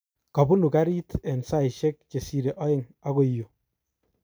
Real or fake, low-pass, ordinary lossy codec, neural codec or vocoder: real; none; none; none